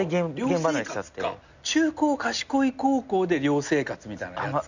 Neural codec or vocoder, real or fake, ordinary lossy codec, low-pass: none; real; none; 7.2 kHz